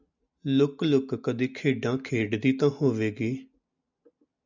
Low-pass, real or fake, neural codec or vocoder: 7.2 kHz; real; none